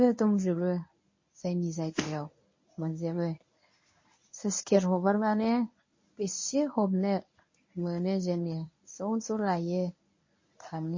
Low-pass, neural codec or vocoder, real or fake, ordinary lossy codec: 7.2 kHz; codec, 24 kHz, 0.9 kbps, WavTokenizer, medium speech release version 1; fake; MP3, 32 kbps